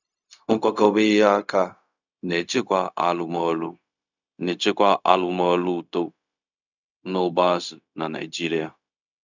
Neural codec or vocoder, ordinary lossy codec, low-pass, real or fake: codec, 16 kHz, 0.4 kbps, LongCat-Audio-Codec; none; 7.2 kHz; fake